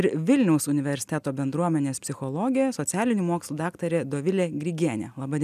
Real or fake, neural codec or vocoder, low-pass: real; none; 14.4 kHz